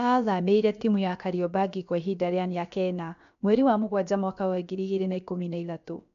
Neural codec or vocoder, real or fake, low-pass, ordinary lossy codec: codec, 16 kHz, about 1 kbps, DyCAST, with the encoder's durations; fake; 7.2 kHz; none